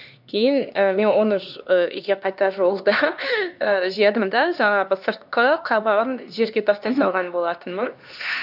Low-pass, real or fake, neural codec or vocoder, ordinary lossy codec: 5.4 kHz; fake; codec, 16 kHz, 2 kbps, X-Codec, HuBERT features, trained on LibriSpeech; none